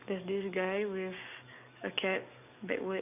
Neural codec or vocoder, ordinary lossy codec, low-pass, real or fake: codec, 44.1 kHz, 7.8 kbps, DAC; none; 3.6 kHz; fake